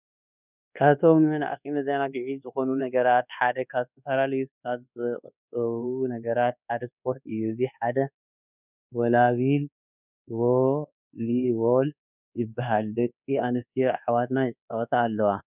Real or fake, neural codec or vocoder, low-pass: fake; codec, 24 kHz, 1.2 kbps, DualCodec; 3.6 kHz